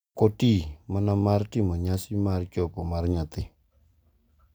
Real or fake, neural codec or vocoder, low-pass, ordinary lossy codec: real; none; none; none